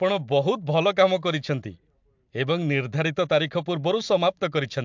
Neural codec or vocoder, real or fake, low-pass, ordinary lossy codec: vocoder, 44.1 kHz, 128 mel bands every 256 samples, BigVGAN v2; fake; 7.2 kHz; MP3, 64 kbps